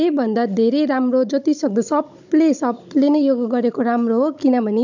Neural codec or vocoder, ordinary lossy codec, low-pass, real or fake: codec, 16 kHz, 16 kbps, FunCodec, trained on Chinese and English, 50 frames a second; none; 7.2 kHz; fake